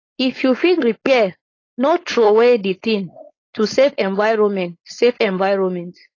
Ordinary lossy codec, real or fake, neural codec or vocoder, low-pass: AAC, 32 kbps; fake; codec, 16 kHz, 4.8 kbps, FACodec; 7.2 kHz